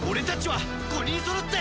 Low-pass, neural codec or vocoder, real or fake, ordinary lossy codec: none; none; real; none